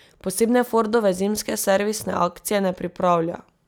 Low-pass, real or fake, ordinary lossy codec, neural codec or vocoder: none; real; none; none